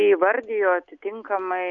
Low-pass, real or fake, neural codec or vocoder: 7.2 kHz; real; none